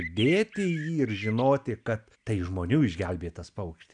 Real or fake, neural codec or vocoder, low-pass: real; none; 9.9 kHz